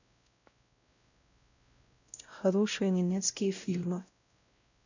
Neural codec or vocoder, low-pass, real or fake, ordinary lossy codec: codec, 16 kHz, 0.5 kbps, X-Codec, WavLM features, trained on Multilingual LibriSpeech; 7.2 kHz; fake; none